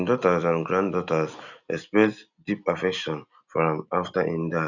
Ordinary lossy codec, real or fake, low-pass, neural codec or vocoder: none; real; 7.2 kHz; none